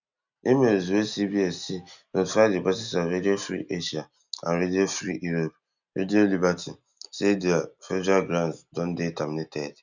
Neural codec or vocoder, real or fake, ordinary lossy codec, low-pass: none; real; none; 7.2 kHz